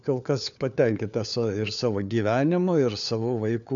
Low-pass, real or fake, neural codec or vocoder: 7.2 kHz; fake; codec, 16 kHz, 4 kbps, FunCodec, trained on LibriTTS, 50 frames a second